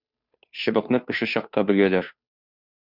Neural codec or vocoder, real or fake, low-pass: codec, 16 kHz, 2 kbps, FunCodec, trained on Chinese and English, 25 frames a second; fake; 5.4 kHz